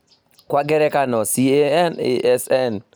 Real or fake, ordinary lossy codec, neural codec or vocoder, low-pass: real; none; none; none